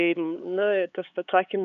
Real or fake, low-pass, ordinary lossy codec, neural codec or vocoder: fake; 7.2 kHz; MP3, 96 kbps; codec, 16 kHz, 4 kbps, X-Codec, HuBERT features, trained on LibriSpeech